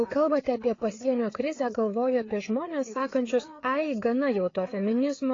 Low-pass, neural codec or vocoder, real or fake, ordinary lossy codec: 7.2 kHz; codec, 16 kHz, 4 kbps, FreqCodec, larger model; fake; AAC, 32 kbps